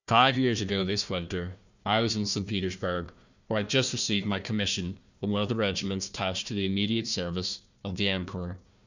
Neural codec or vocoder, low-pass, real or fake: codec, 16 kHz, 1 kbps, FunCodec, trained on Chinese and English, 50 frames a second; 7.2 kHz; fake